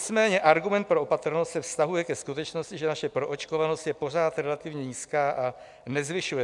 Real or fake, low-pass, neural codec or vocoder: real; 10.8 kHz; none